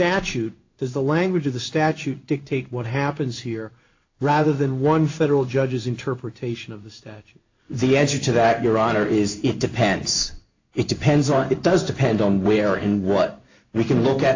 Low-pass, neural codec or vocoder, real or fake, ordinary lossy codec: 7.2 kHz; codec, 16 kHz in and 24 kHz out, 1 kbps, XY-Tokenizer; fake; AAC, 48 kbps